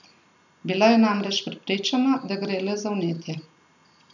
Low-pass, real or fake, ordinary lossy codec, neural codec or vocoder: 7.2 kHz; real; none; none